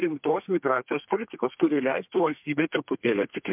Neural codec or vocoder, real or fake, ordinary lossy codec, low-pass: codec, 32 kHz, 1.9 kbps, SNAC; fake; MP3, 32 kbps; 3.6 kHz